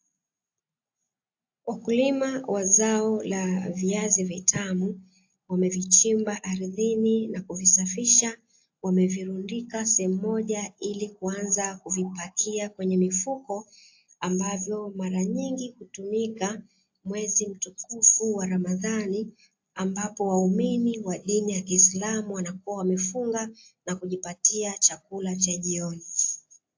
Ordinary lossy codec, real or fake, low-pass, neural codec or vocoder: AAC, 48 kbps; real; 7.2 kHz; none